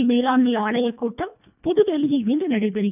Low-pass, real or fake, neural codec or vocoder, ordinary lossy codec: 3.6 kHz; fake; codec, 24 kHz, 1.5 kbps, HILCodec; none